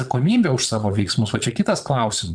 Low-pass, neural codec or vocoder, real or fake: 9.9 kHz; vocoder, 22.05 kHz, 80 mel bands, Vocos; fake